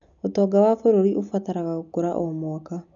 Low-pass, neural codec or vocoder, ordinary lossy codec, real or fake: 7.2 kHz; none; none; real